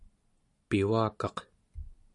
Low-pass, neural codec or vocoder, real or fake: 10.8 kHz; none; real